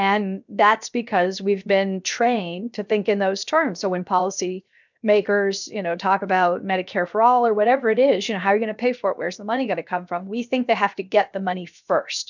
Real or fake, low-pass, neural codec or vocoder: fake; 7.2 kHz; codec, 16 kHz, 0.7 kbps, FocalCodec